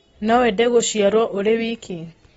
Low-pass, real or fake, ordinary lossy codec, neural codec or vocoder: 19.8 kHz; real; AAC, 24 kbps; none